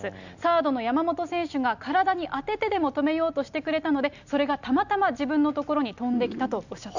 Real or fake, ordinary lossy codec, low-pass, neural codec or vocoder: real; none; 7.2 kHz; none